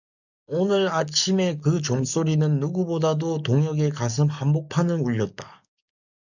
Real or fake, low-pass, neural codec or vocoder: fake; 7.2 kHz; codec, 44.1 kHz, 7.8 kbps, DAC